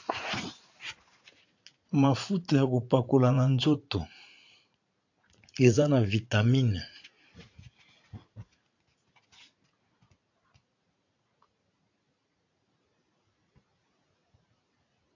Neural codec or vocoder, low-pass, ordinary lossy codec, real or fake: vocoder, 44.1 kHz, 80 mel bands, Vocos; 7.2 kHz; AAC, 48 kbps; fake